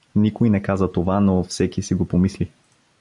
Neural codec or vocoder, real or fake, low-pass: none; real; 10.8 kHz